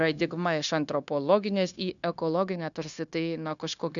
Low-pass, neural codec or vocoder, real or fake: 7.2 kHz; codec, 16 kHz, 0.9 kbps, LongCat-Audio-Codec; fake